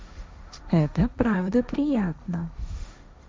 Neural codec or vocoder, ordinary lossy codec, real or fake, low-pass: codec, 16 kHz, 1.1 kbps, Voila-Tokenizer; none; fake; none